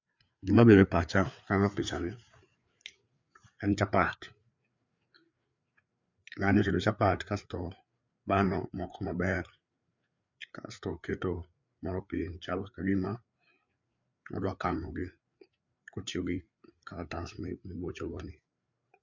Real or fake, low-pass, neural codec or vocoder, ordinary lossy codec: fake; 7.2 kHz; codec, 16 kHz, 4 kbps, FreqCodec, larger model; MP3, 64 kbps